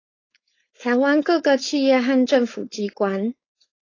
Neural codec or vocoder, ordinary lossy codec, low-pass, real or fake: codec, 16 kHz, 4.8 kbps, FACodec; AAC, 32 kbps; 7.2 kHz; fake